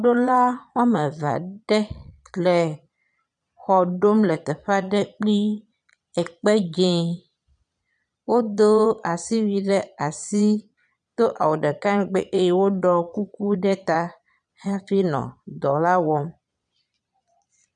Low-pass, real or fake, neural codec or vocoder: 9.9 kHz; fake; vocoder, 22.05 kHz, 80 mel bands, Vocos